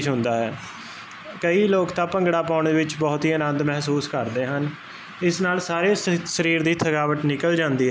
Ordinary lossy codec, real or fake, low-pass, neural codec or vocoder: none; real; none; none